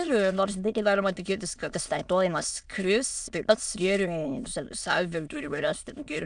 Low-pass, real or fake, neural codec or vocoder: 9.9 kHz; fake; autoencoder, 22.05 kHz, a latent of 192 numbers a frame, VITS, trained on many speakers